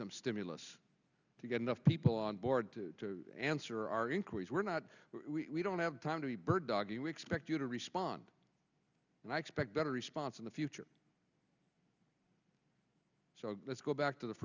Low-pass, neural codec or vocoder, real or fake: 7.2 kHz; none; real